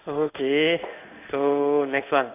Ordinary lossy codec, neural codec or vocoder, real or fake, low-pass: none; codec, 16 kHz in and 24 kHz out, 1 kbps, XY-Tokenizer; fake; 3.6 kHz